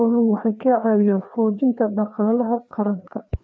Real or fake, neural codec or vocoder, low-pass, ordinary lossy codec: fake; codec, 16 kHz, 2 kbps, FreqCodec, larger model; none; none